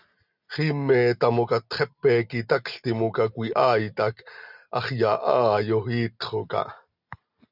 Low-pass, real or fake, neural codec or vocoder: 5.4 kHz; real; none